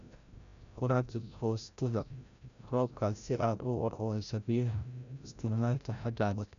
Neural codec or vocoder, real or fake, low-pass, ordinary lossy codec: codec, 16 kHz, 0.5 kbps, FreqCodec, larger model; fake; 7.2 kHz; none